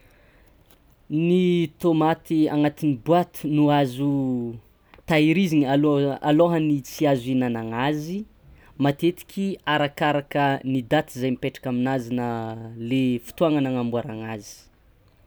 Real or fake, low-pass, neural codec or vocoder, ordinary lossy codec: real; none; none; none